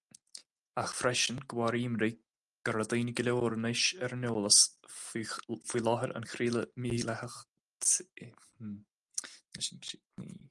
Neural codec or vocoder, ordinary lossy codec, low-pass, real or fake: none; Opus, 32 kbps; 10.8 kHz; real